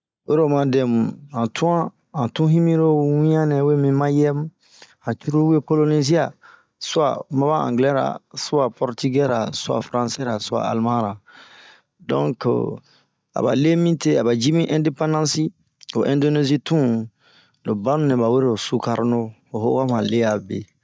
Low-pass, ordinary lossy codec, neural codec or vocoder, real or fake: none; none; none; real